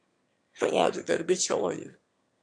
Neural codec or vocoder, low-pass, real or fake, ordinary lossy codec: autoencoder, 22.05 kHz, a latent of 192 numbers a frame, VITS, trained on one speaker; 9.9 kHz; fake; MP3, 64 kbps